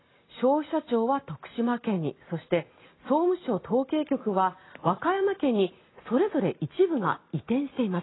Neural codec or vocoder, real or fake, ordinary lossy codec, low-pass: none; real; AAC, 16 kbps; 7.2 kHz